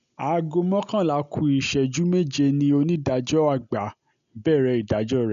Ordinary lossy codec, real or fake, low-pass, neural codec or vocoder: none; real; 7.2 kHz; none